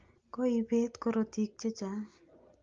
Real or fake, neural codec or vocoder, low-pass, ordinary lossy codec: real; none; 7.2 kHz; Opus, 24 kbps